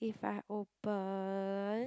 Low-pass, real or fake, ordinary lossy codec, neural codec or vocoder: none; real; none; none